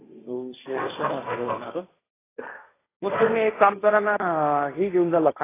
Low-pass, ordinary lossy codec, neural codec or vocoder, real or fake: 3.6 kHz; AAC, 16 kbps; codec, 16 kHz, 1.1 kbps, Voila-Tokenizer; fake